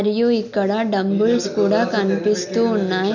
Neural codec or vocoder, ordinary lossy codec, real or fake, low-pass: none; none; real; 7.2 kHz